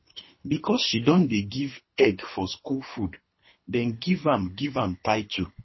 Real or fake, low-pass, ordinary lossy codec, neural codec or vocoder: fake; 7.2 kHz; MP3, 24 kbps; codec, 24 kHz, 3 kbps, HILCodec